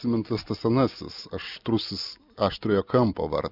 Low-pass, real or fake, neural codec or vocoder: 5.4 kHz; real; none